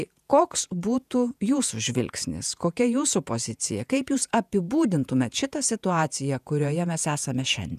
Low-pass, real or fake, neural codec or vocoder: 14.4 kHz; fake; vocoder, 48 kHz, 128 mel bands, Vocos